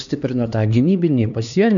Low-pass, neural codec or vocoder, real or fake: 7.2 kHz; codec, 16 kHz, 2 kbps, X-Codec, HuBERT features, trained on LibriSpeech; fake